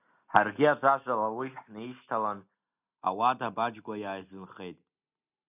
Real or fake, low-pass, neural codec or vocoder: real; 3.6 kHz; none